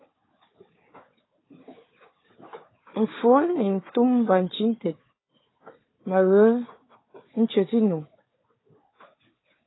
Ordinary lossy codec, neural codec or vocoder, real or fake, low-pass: AAC, 16 kbps; codec, 16 kHz, 4 kbps, FunCodec, trained on Chinese and English, 50 frames a second; fake; 7.2 kHz